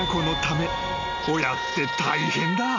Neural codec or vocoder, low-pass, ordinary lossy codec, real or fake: none; 7.2 kHz; none; real